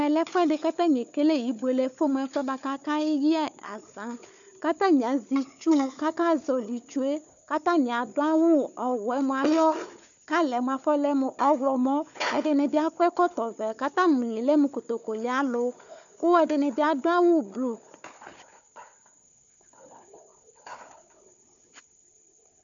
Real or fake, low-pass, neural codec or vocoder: fake; 7.2 kHz; codec, 16 kHz, 4 kbps, FunCodec, trained on Chinese and English, 50 frames a second